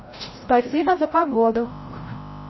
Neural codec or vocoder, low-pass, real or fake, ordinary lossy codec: codec, 16 kHz, 0.5 kbps, FreqCodec, larger model; 7.2 kHz; fake; MP3, 24 kbps